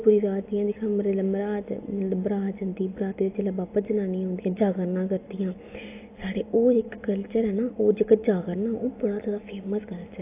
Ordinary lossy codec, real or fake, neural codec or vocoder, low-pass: AAC, 24 kbps; real; none; 3.6 kHz